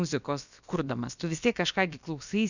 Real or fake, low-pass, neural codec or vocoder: fake; 7.2 kHz; codec, 16 kHz, about 1 kbps, DyCAST, with the encoder's durations